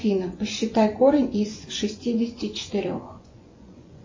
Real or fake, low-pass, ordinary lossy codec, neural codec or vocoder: fake; 7.2 kHz; MP3, 32 kbps; vocoder, 24 kHz, 100 mel bands, Vocos